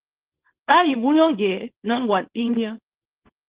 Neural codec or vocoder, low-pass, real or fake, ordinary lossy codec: codec, 24 kHz, 0.9 kbps, WavTokenizer, small release; 3.6 kHz; fake; Opus, 16 kbps